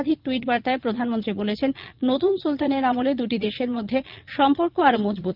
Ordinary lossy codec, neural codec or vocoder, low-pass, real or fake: Opus, 32 kbps; vocoder, 22.05 kHz, 80 mel bands, Vocos; 5.4 kHz; fake